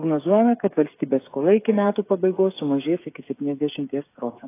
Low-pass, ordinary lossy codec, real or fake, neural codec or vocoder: 3.6 kHz; AAC, 24 kbps; fake; codec, 16 kHz, 8 kbps, FreqCodec, smaller model